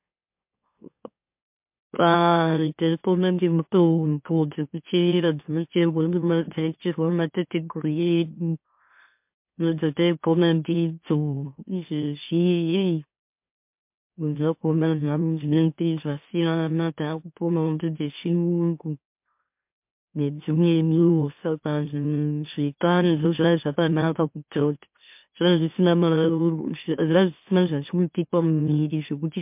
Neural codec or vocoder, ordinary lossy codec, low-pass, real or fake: autoencoder, 44.1 kHz, a latent of 192 numbers a frame, MeloTTS; MP3, 32 kbps; 3.6 kHz; fake